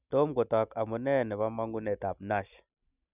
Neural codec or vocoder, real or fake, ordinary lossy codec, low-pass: none; real; none; 3.6 kHz